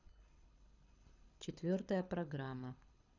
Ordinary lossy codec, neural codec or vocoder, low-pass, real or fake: none; codec, 24 kHz, 6 kbps, HILCodec; 7.2 kHz; fake